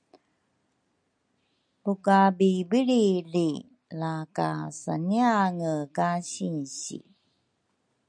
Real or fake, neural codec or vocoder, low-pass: real; none; 9.9 kHz